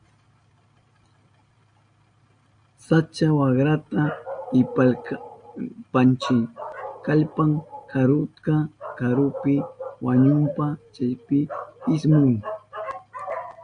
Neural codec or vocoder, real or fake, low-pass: none; real; 9.9 kHz